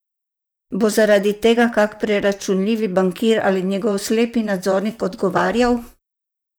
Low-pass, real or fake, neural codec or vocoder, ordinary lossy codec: none; fake; vocoder, 44.1 kHz, 128 mel bands, Pupu-Vocoder; none